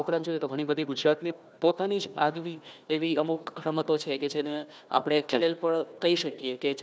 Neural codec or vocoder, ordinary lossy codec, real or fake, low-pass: codec, 16 kHz, 1 kbps, FunCodec, trained on Chinese and English, 50 frames a second; none; fake; none